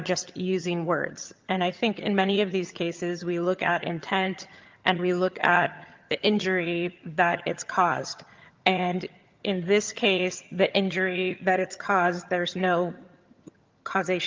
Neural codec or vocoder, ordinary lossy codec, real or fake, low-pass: vocoder, 22.05 kHz, 80 mel bands, HiFi-GAN; Opus, 32 kbps; fake; 7.2 kHz